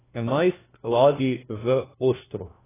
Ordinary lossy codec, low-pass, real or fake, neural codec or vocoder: AAC, 16 kbps; 3.6 kHz; fake; codec, 16 kHz, 1 kbps, FunCodec, trained on LibriTTS, 50 frames a second